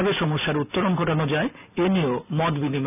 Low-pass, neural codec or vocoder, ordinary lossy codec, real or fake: 3.6 kHz; none; none; real